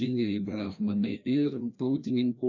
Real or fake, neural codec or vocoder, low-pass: fake; codec, 16 kHz, 1 kbps, FreqCodec, larger model; 7.2 kHz